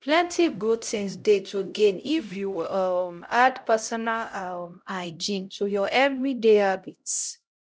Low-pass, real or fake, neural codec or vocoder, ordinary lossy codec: none; fake; codec, 16 kHz, 0.5 kbps, X-Codec, HuBERT features, trained on LibriSpeech; none